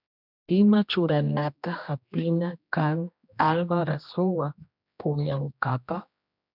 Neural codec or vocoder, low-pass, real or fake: codec, 16 kHz, 1 kbps, X-Codec, HuBERT features, trained on general audio; 5.4 kHz; fake